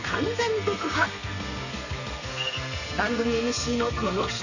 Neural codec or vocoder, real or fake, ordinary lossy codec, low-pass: codec, 32 kHz, 1.9 kbps, SNAC; fake; none; 7.2 kHz